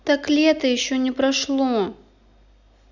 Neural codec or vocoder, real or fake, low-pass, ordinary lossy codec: none; real; 7.2 kHz; none